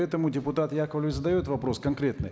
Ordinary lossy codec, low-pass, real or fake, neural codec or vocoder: none; none; real; none